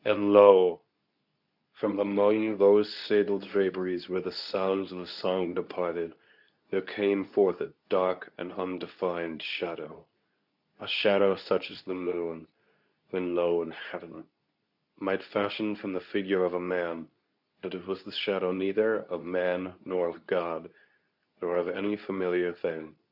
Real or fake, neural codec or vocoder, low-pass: fake; codec, 24 kHz, 0.9 kbps, WavTokenizer, medium speech release version 2; 5.4 kHz